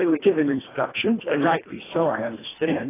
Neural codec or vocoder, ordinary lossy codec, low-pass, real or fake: codec, 24 kHz, 1.5 kbps, HILCodec; AAC, 16 kbps; 3.6 kHz; fake